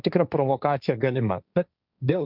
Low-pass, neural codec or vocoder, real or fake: 5.4 kHz; codec, 16 kHz, 1.1 kbps, Voila-Tokenizer; fake